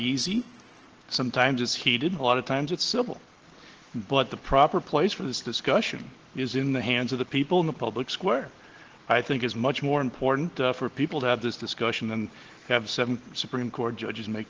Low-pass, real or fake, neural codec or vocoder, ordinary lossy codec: 7.2 kHz; real; none; Opus, 16 kbps